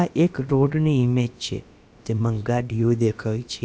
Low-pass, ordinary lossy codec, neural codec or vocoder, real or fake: none; none; codec, 16 kHz, about 1 kbps, DyCAST, with the encoder's durations; fake